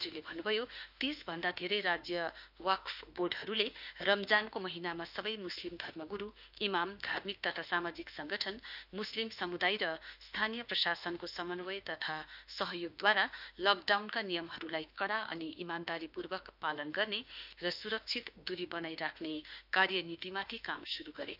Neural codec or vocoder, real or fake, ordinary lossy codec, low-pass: autoencoder, 48 kHz, 32 numbers a frame, DAC-VAE, trained on Japanese speech; fake; none; 5.4 kHz